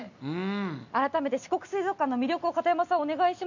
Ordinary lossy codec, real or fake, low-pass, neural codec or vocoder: none; real; 7.2 kHz; none